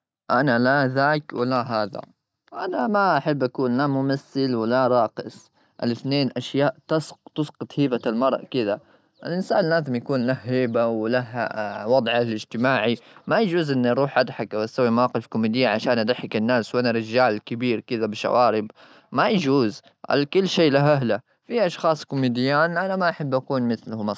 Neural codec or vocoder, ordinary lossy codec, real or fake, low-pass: none; none; real; none